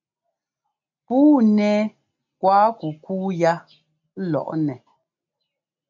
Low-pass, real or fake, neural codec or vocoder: 7.2 kHz; real; none